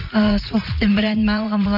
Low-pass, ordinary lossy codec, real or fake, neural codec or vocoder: 5.4 kHz; none; fake; vocoder, 44.1 kHz, 80 mel bands, Vocos